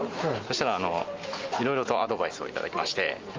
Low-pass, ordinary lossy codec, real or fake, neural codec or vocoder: 7.2 kHz; Opus, 32 kbps; real; none